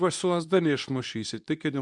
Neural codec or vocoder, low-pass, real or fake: codec, 24 kHz, 0.9 kbps, WavTokenizer, medium speech release version 2; 10.8 kHz; fake